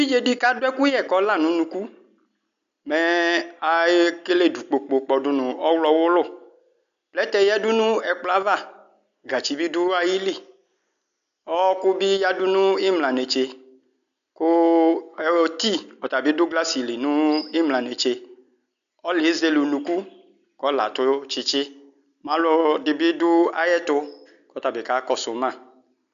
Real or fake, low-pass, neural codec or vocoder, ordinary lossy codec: real; 7.2 kHz; none; AAC, 96 kbps